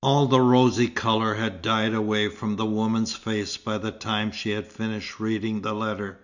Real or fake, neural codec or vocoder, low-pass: real; none; 7.2 kHz